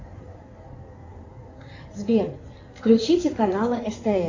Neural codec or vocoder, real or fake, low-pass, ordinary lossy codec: codec, 16 kHz in and 24 kHz out, 2.2 kbps, FireRedTTS-2 codec; fake; 7.2 kHz; AAC, 48 kbps